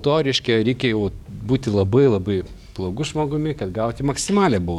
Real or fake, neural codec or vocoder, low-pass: fake; codec, 44.1 kHz, 7.8 kbps, DAC; 19.8 kHz